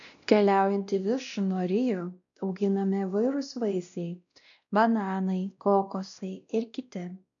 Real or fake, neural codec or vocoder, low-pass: fake; codec, 16 kHz, 1 kbps, X-Codec, WavLM features, trained on Multilingual LibriSpeech; 7.2 kHz